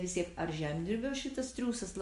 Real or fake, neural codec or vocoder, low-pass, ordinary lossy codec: real; none; 14.4 kHz; MP3, 48 kbps